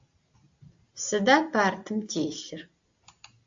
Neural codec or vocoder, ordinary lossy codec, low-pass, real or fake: none; AAC, 64 kbps; 7.2 kHz; real